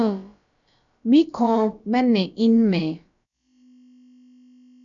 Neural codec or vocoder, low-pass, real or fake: codec, 16 kHz, about 1 kbps, DyCAST, with the encoder's durations; 7.2 kHz; fake